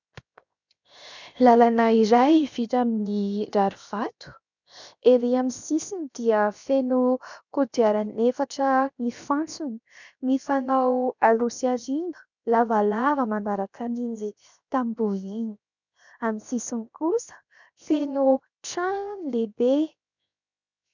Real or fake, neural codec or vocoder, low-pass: fake; codec, 16 kHz, 0.7 kbps, FocalCodec; 7.2 kHz